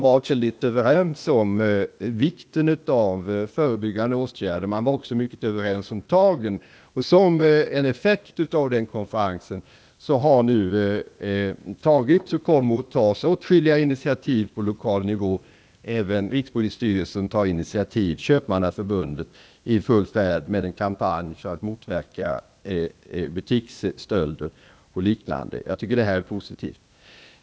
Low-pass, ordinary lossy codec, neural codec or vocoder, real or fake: none; none; codec, 16 kHz, 0.8 kbps, ZipCodec; fake